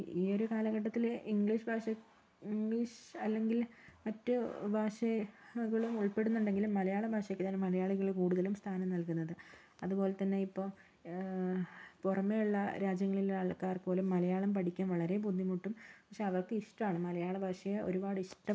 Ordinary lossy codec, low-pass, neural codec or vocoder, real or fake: none; none; none; real